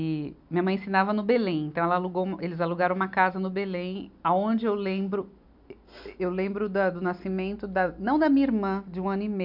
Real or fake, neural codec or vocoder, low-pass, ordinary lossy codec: real; none; 5.4 kHz; MP3, 48 kbps